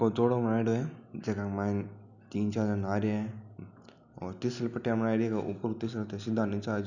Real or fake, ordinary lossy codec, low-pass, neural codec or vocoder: real; none; 7.2 kHz; none